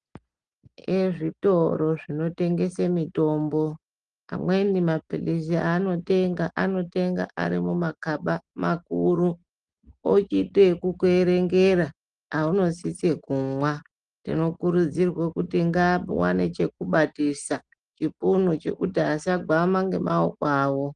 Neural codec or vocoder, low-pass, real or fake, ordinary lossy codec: none; 9.9 kHz; real; Opus, 24 kbps